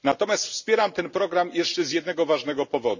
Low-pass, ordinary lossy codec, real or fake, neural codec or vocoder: 7.2 kHz; none; real; none